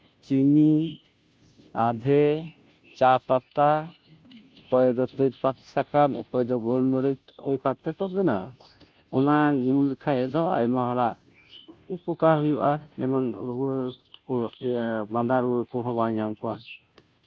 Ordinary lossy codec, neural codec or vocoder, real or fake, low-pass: none; codec, 16 kHz, 0.5 kbps, FunCodec, trained on Chinese and English, 25 frames a second; fake; none